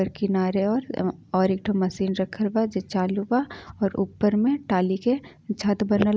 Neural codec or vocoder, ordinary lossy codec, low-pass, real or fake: none; none; none; real